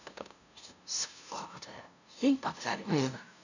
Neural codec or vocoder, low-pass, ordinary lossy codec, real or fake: codec, 16 kHz, 0.5 kbps, FunCodec, trained on LibriTTS, 25 frames a second; 7.2 kHz; none; fake